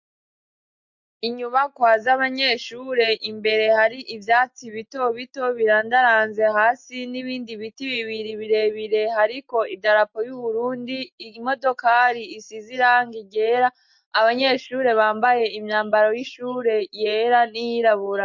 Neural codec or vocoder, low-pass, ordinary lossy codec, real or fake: none; 7.2 kHz; MP3, 48 kbps; real